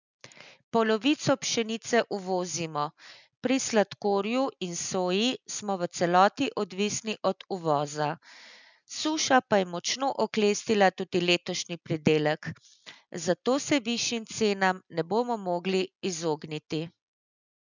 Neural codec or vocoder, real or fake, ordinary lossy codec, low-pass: none; real; none; 7.2 kHz